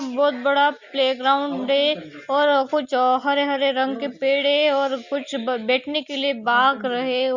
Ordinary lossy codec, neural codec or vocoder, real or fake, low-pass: Opus, 64 kbps; none; real; 7.2 kHz